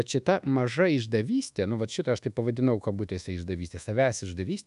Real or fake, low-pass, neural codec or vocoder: fake; 10.8 kHz; codec, 24 kHz, 1.2 kbps, DualCodec